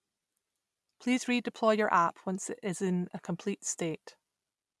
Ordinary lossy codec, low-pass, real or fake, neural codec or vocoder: none; none; real; none